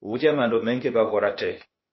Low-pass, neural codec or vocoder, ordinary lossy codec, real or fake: 7.2 kHz; codec, 16 kHz, 0.8 kbps, ZipCodec; MP3, 24 kbps; fake